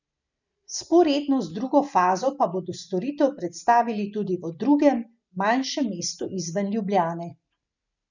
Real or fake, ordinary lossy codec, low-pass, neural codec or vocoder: real; none; 7.2 kHz; none